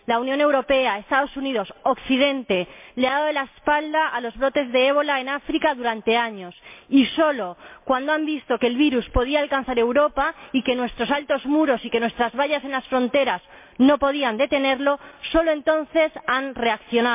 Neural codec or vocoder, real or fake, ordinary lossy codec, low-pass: none; real; MP3, 32 kbps; 3.6 kHz